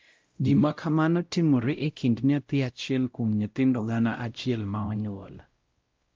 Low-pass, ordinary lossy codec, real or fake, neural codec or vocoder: 7.2 kHz; Opus, 24 kbps; fake; codec, 16 kHz, 0.5 kbps, X-Codec, WavLM features, trained on Multilingual LibriSpeech